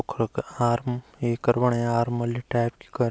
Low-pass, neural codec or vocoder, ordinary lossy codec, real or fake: none; none; none; real